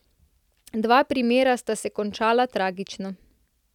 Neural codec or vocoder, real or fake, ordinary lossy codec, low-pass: none; real; none; 19.8 kHz